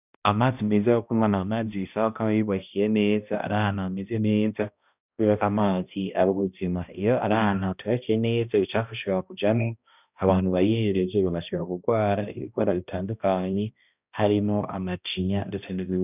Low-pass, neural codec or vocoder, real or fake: 3.6 kHz; codec, 16 kHz, 1 kbps, X-Codec, HuBERT features, trained on balanced general audio; fake